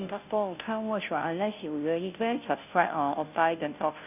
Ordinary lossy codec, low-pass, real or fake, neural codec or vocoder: none; 3.6 kHz; fake; codec, 16 kHz, 0.5 kbps, FunCodec, trained on Chinese and English, 25 frames a second